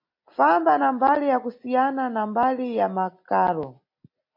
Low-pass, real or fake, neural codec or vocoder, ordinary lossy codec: 5.4 kHz; real; none; MP3, 32 kbps